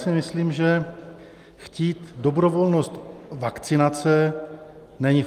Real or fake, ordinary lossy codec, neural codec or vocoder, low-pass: real; Opus, 32 kbps; none; 14.4 kHz